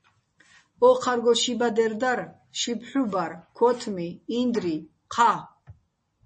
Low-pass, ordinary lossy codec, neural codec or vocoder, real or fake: 9.9 kHz; MP3, 32 kbps; none; real